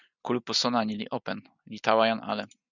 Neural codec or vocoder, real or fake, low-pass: none; real; 7.2 kHz